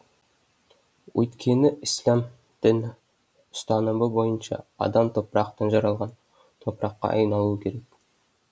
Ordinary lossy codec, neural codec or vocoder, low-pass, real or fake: none; none; none; real